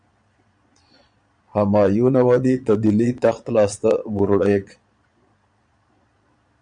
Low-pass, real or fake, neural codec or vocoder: 9.9 kHz; fake; vocoder, 22.05 kHz, 80 mel bands, Vocos